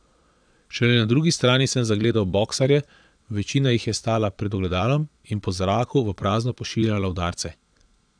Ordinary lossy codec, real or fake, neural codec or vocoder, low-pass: none; fake; vocoder, 22.05 kHz, 80 mel bands, Vocos; 9.9 kHz